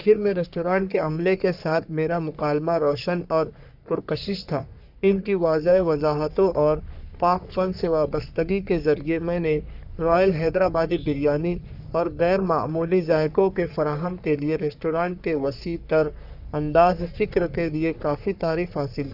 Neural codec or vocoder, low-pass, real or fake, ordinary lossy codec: codec, 44.1 kHz, 3.4 kbps, Pupu-Codec; 5.4 kHz; fake; none